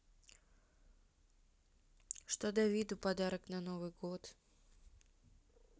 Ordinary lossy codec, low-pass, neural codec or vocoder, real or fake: none; none; none; real